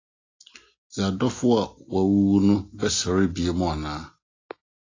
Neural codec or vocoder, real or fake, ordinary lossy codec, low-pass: none; real; AAC, 32 kbps; 7.2 kHz